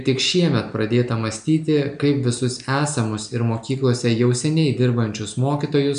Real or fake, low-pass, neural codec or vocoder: real; 9.9 kHz; none